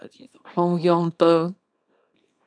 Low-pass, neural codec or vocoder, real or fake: 9.9 kHz; codec, 24 kHz, 0.9 kbps, WavTokenizer, small release; fake